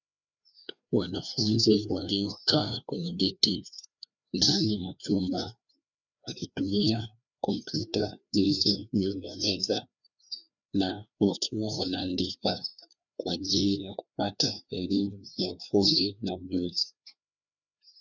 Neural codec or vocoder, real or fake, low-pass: codec, 16 kHz, 2 kbps, FreqCodec, larger model; fake; 7.2 kHz